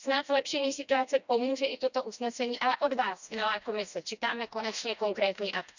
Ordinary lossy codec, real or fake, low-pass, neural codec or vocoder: none; fake; 7.2 kHz; codec, 16 kHz, 1 kbps, FreqCodec, smaller model